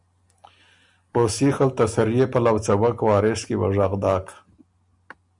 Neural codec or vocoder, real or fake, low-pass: none; real; 10.8 kHz